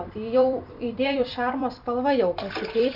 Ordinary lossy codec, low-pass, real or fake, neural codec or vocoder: Opus, 64 kbps; 5.4 kHz; fake; vocoder, 44.1 kHz, 128 mel bands every 256 samples, BigVGAN v2